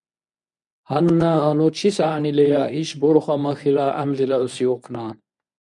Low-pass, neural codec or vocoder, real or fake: 10.8 kHz; codec, 24 kHz, 0.9 kbps, WavTokenizer, medium speech release version 1; fake